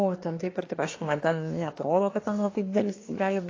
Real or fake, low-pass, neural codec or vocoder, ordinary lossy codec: fake; 7.2 kHz; codec, 24 kHz, 1 kbps, SNAC; AAC, 32 kbps